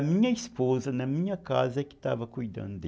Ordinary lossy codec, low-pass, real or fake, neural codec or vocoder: none; none; real; none